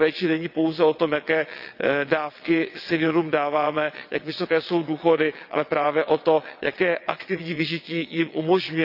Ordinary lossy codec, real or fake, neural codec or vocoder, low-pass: none; fake; vocoder, 22.05 kHz, 80 mel bands, WaveNeXt; 5.4 kHz